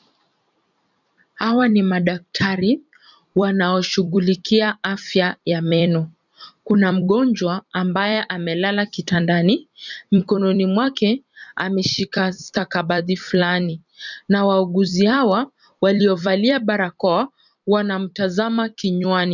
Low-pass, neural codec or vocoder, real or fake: 7.2 kHz; vocoder, 44.1 kHz, 128 mel bands every 256 samples, BigVGAN v2; fake